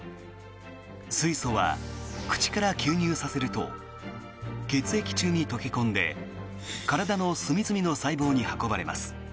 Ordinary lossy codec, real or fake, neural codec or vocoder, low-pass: none; real; none; none